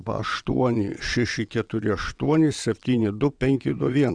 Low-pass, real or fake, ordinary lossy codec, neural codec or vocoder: 9.9 kHz; fake; Opus, 64 kbps; vocoder, 22.05 kHz, 80 mel bands, WaveNeXt